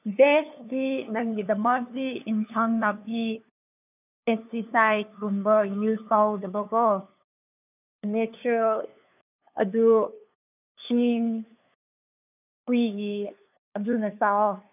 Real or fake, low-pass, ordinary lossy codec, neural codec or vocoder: fake; 3.6 kHz; none; codec, 16 kHz, 2 kbps, FunCodec, trained on LibriTTS, 25 frames a second